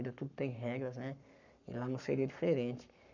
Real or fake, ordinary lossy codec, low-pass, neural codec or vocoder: fake; none; 7.2 kHz; codec, 44.1 kHz, 7.8 kbps, Pupu-Codec